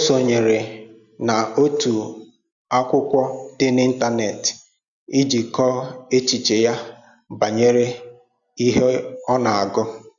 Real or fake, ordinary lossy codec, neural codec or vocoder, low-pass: real; none; none; 7.2 kHz